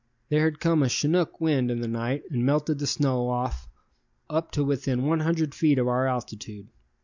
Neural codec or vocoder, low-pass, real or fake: none; 7.2 kHz; real